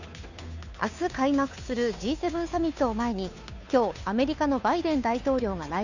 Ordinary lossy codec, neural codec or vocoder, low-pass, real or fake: none; codec, 16 kHz, 2 kbps, FunCodec, trained on Chinese and English, 25 frames a second; 7.2 kHz; fake